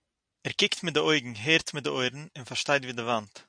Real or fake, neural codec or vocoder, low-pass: real; none; 9.9 kHz